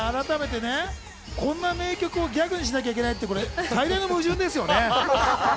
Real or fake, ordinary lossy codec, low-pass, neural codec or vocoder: real; none; none; none